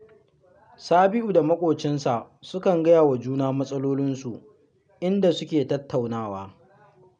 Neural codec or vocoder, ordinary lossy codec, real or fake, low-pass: none; none; real; 9.9 kHz